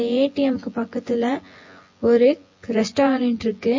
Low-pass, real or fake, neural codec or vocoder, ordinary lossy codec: 7.2 kHz; fake; vocoder, 24 kHz, 100 mel bands, Vocos; MP3, 32 kbps